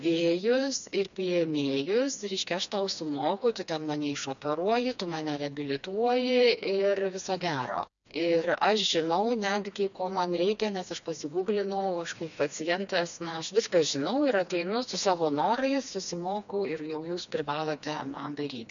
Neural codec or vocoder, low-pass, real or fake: codec, 16 kHz, 2 kbps, FreqCodec, smaller model; 7.2 kHz; fake